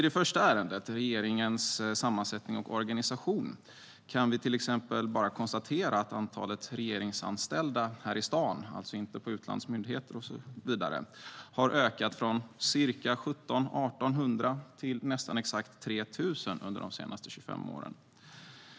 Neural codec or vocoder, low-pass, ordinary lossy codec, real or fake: none; none; none; real